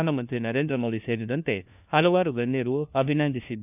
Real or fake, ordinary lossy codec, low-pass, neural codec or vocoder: fake; none; 3.6 kHz; codec, 16 kHz, 1 kbps, FunCodec, trained on LibriTTS, 50 frames a second